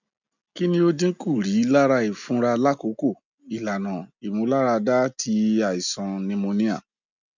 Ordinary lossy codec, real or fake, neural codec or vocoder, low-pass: none; real; none; 7.2 kHz